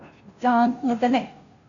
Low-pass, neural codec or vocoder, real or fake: 7.2 kHz; codec, 16 kHz, 0.5 kbps, FunCodec, trained on Chinese and English, 25 frames a second; fake